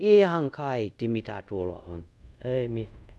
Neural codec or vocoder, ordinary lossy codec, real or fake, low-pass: codec, 24 kHz, 0.5 kbps, DualCodec; none; fake; none